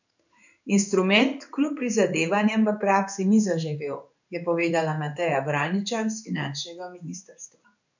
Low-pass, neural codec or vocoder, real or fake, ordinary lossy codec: 7.2 kHz; codec, 16 kHz in and 24 kHz out, 1 kbps, XY-Tokenizer; fake; none